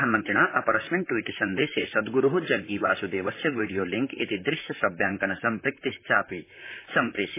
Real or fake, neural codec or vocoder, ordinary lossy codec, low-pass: fake; vocoder, 44.1 kHz, 128 mel bands, Pupu-Vocoder; MP3, 16 kbps; 3.6 kHz